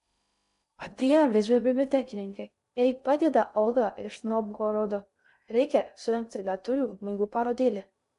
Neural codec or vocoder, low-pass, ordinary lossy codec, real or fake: codec, 16 kHz in and 24 kHz out, 0.6 kbps, FocalCodec, streaming, 2048 codes; 10.8 kHz; AAC, 96 kbps; fake